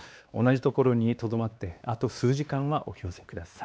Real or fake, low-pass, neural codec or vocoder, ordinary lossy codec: fake; none; codec, 16 kHz, 2 kbps, X-Codec, WavLM features, trained on Multilingual LibriSpeech; none